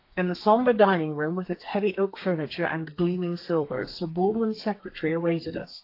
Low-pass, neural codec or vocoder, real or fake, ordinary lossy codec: 5.4 kHz; codec, 32 kHz, 1.9 kbps, SNAC; fake; AAC, 32 kbps